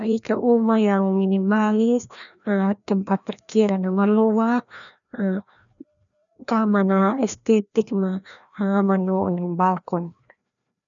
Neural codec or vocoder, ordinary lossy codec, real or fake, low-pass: codec, 16 kHz, 1 kbps, FreqCodec, larger model; none; fake; 7.2 kHz